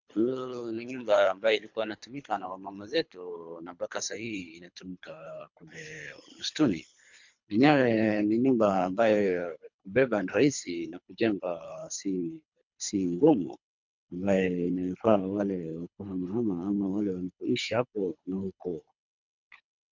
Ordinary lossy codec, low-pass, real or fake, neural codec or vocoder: MP3, 64 kbps; 7.2 kHz; fake; codec, 24 kHz, 3 kbps, HILCodec